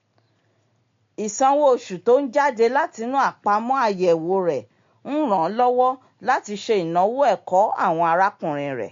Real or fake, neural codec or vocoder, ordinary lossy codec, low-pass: real; none; MP3, 48 kbps; 7.2 kHz